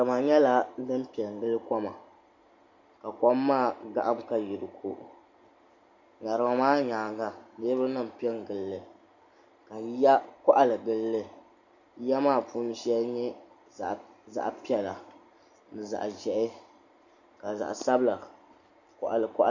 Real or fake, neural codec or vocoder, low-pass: real; none; 7.2 kHz